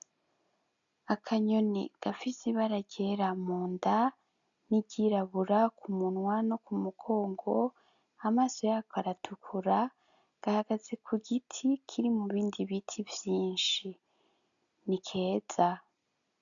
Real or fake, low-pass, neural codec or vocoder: real; 7.2 kHz; none